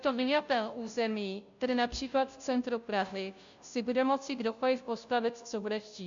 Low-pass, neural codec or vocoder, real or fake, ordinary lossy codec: 7.2 kHz; codec, 16 kHz, 0.5 kbps, FunCodec, trained on Chinese and English, 25 frames a second; fake; MP3, 64 kbps